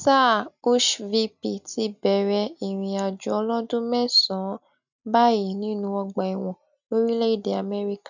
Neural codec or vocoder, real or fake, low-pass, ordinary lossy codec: none; real; 7.2 kHz; none